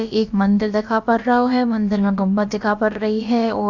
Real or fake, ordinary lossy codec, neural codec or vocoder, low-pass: fake; none; codec, 16 kHz, about 1 kbps, DyCAST, with the encoder's durations; 7.2 kHz